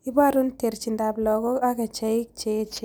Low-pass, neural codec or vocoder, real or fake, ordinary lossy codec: none; none; real; none